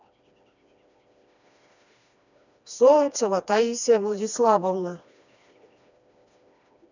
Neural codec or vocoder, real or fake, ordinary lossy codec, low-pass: codec, 16 kHz, 2 kbps, FreqCodec, smaller model; fake; none; 7.2 kHz